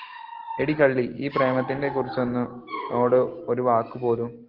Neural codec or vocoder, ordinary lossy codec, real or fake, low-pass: none; Opus, 16 kbps; real; 5.4 kHz